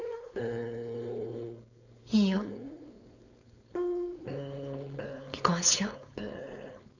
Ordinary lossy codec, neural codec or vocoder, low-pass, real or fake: none; codec, 16 kHz, 4.8 kbps, FACodec; 7.2 kHz; fake